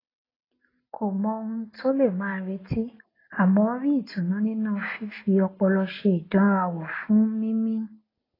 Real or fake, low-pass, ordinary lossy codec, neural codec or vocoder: real; 5.4 kHz; AAC, 24 kbps; none